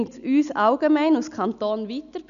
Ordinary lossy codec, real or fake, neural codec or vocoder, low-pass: none; real; none; 7.2 kHz